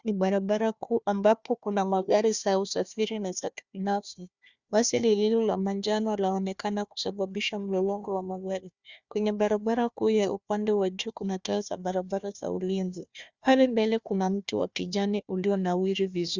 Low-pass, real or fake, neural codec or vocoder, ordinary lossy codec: 7.2 kHz; fake; codec, 16 kHz, 1 kbps, FunCodec, trained on Chinese and English, 50 frames a second; Opus, 64 kbps